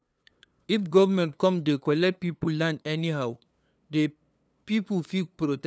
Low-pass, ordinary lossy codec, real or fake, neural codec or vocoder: none; none; fake; codec, 16 kHz, 2 kbps, FunCodec, trained on LibriTTS, 25 frames a second